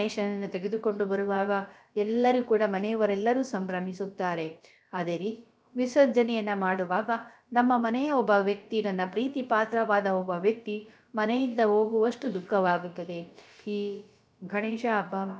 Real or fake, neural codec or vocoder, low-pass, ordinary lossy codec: fake; codec, 16 kHz, about 1 kbps, DyCAST, with the encoder's durations; none; none